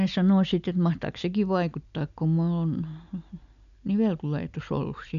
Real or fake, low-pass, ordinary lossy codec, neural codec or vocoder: real; 7.2 kHz; none; none